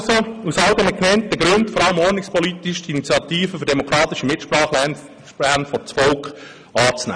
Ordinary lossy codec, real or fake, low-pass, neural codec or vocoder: none; real; 9.9 kHz; none